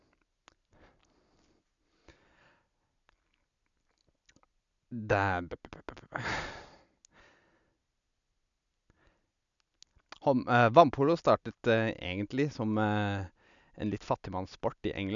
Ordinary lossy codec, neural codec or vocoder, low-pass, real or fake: none; none; 7.2 kHz; real